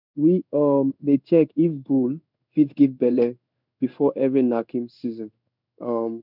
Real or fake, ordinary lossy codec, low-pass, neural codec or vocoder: fake; none; 5.4 kHz; codec, 16 kHz in and 24 kHz out, 1 kbps, XY-Tokenizer